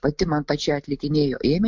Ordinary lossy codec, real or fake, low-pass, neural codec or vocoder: MP3, 64 kbps; real; 7.2 kHz; none